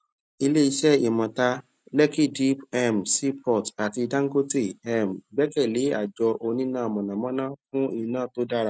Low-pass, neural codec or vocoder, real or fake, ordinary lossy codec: none; none; real; none